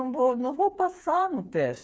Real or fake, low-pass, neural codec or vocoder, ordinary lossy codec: fake; none; codec, 16 kHz, 4 kbps, FreqCodec, smaller model; none